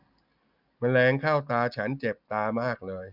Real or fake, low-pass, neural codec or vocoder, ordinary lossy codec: real; 5.4 kHz; none; none